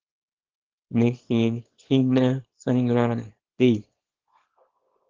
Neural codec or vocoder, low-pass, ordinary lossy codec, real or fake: codec, 24 kHz, 0.9 kbps, WavTokenizer, small release; 7.2 kHz; Opus, 16 kbps; fake